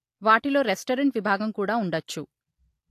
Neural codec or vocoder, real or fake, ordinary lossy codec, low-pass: none; real; AAC, 64 kbps; 14.4 kHz